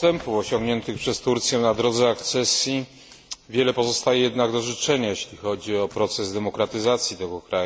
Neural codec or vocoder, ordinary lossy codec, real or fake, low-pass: none; none; real; none